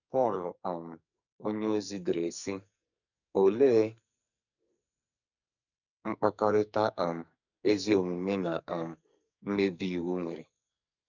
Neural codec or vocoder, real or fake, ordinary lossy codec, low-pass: codec, 44.1 kHz, 2.6 kbps, SNAC; fake; none; 7.2 kHz